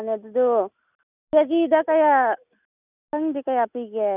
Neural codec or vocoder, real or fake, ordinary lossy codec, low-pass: none; real; none; 3.6 kHz